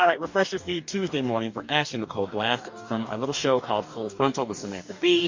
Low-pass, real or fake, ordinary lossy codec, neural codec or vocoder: 7.2 kHz; fake; MP3, 48 kbps; codec, 44.1 kHz, 2.6 kbps, DAC